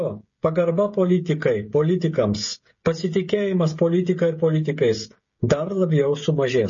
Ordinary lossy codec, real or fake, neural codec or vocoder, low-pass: MP3, 32 kbps; fake; codec, 16 kHz, 16 kbps, FreqCodec, smaller model; 7.2 kHz